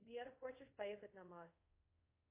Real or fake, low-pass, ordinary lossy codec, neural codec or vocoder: fake; 3.6 kHz; MP3, 24 kbps; codec, 24 kHz, 0.5 kbps, DualCodec